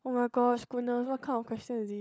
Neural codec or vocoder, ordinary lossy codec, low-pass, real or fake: codec, 16 kHz, 16 kbps, FunCodec, trained on LibriTTS, 50 frames a second; none; none; fake